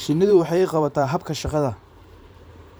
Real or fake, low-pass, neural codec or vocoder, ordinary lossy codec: fake; none; vocoder, 44.1 kHz, 128 mel bands every 512 samples, BigVGAN v2; none